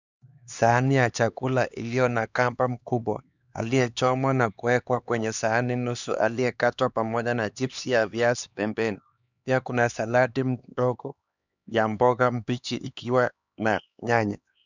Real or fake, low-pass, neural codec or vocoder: fake; 7.2 kHz; codec, 16 kHz, 2 kbps, X-Codec, HuBERT features, trained on LibriSpeech